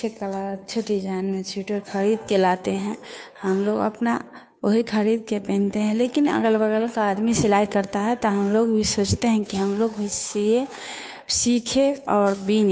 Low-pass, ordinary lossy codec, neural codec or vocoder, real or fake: none; none; codec, 16 kHz, 2 kbps, FunCodec, trained on Chinese and English, 25 frames a second; fake